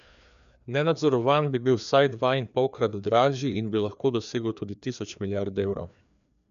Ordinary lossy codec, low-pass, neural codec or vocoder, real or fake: none; 7.2 kHz; codec, 16 kHz, 2 kbps, FreqCodec, larger model; fake